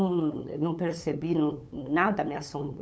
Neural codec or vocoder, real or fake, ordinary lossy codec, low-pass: codec, 16 kHz, 8 kbps, FunCodec, trained on LibriTTS, 25 frames a second; fake; none; none